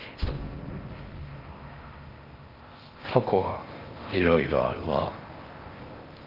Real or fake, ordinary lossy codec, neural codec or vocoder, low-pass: fake; Opus, 24 kbps; codec, 16 kHz in and 24 kHz out, 0.6 kbps, FocalCodec, streaming, 4096 codes; 5.4 kHz